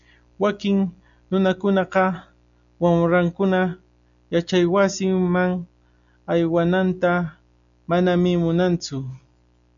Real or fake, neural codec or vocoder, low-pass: real; none; 7.2 kHz